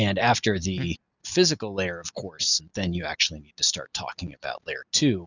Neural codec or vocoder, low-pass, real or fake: none; 7.2 kHz; real